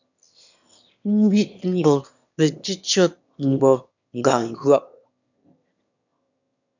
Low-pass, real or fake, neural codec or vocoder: 7.2 kHz; fake; autoencoder, 22.05 kHz, a latent of 192 numbers a frame, VITS, trained on one speaker